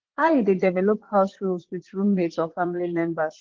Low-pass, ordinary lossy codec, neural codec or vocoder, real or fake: 7.2 kHz; Opus, 16 kbps; vocoder, 22.05 kHz, 80 mel bands, Vocos; fake